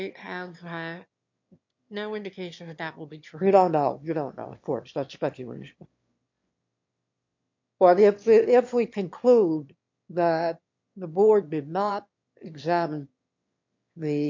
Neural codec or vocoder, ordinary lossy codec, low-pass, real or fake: autoencoder, 22.05 kHz, a latent of 192 numbers a frame, VITS, trained on one speaker; MP3, 48 kbps; 7.2 kHz; fake